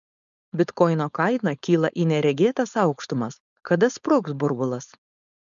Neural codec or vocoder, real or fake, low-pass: codec, 16 kHz, 4.8 kbps, FACodec; fake; 7.2 kHz